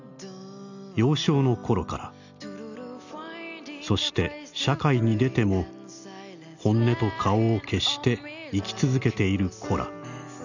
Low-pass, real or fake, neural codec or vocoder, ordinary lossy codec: 7.2 kHz; real; none; none